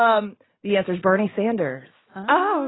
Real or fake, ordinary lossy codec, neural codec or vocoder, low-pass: real; AAC, 16 kbps; none; 7.2 kHz